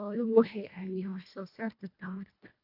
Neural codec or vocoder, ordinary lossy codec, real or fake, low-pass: codec, 24 kHz, 1.5 kbps, HILCodec; AAC, 48 kbps; fake; 5.4 kHz